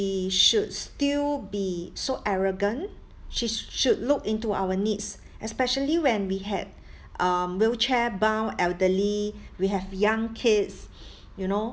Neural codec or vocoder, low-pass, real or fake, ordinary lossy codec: none; none; real; none